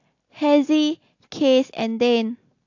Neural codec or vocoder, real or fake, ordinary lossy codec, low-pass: none; real; AAC, 48 kbps; 7.2 kHz